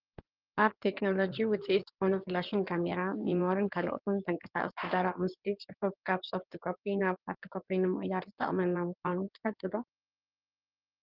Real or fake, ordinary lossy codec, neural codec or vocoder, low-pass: fake; Opus, 24 kbps; codec, 16 kHz in and 24 kHz out, 2.2 kbps, FireRedTTS-2 codec; 5.4 kHz